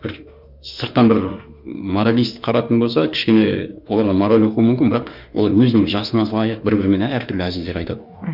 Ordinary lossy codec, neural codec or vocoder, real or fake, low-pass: Opus, 64 kbps; autoencoder, 48 kHz, 32 numbers a frame, DAC-VAE, trained on Japanese speech; fake; 5.4 kHz